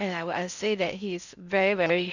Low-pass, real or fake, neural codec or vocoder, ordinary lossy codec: 7.2 kHz; fake; codec, 16 kHz in and 24 kHz out, 0.6 kbps, FocalCodec, streaming, 4096 codes; none